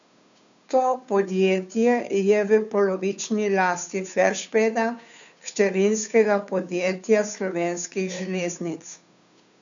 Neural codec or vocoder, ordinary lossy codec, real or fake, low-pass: codec, 16 kHz, 2 kbps, FunCodec, trained on Chinese and English, 25 frames a second; none; fake; 7.2 kHz